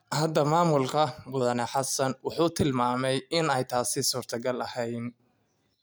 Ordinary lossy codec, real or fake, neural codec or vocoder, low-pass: none; real; none; none